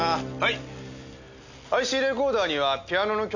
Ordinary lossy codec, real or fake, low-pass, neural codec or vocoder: none; real; 7.2 kHz; none